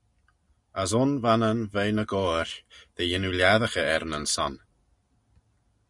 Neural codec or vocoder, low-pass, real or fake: none; 10.8 kHz; real